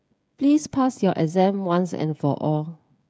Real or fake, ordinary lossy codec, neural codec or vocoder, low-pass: fake; none; codec, 16 kHz, 16 kbps, FreqCodec, smaller model; none